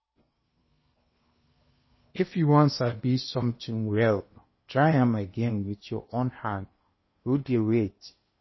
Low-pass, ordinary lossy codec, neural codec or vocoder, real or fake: 7.2 kHz; MP3, 24 kbps; codec, 16 kHz in and 24 kHz out, 0.8 kbps, FocalCodec, streaming, 65536 codes; fake